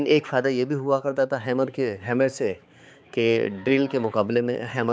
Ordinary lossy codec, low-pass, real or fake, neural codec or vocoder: none; none; fake; codec, 16 kHz, 4 kbps, X-Codec, HuBERT features, trained on balanced general audio